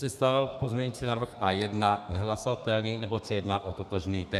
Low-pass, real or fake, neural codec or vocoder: 14.4 kHz; fake; codec, 32 kHz, 1.9 kbps, SNAC